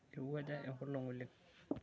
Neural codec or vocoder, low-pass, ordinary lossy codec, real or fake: none; none; none; real